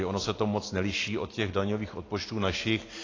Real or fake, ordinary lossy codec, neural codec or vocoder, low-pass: real; AAC, 32 kbps; none; 7.2 kHz